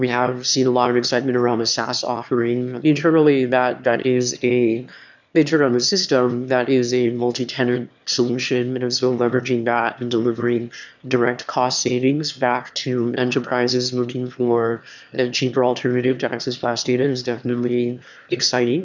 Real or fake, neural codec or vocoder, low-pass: fake; autoencoder, 22.05 kHz, a latent of 192 numbers a frame, VITS, trained on one speaker; 7.2 kHz